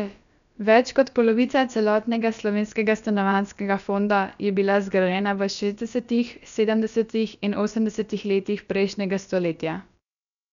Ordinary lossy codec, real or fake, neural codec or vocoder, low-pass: none; fake; codec, 16 kHz, about 1 kbps, DyCAST, with the encoder's durations; 7.2 kHz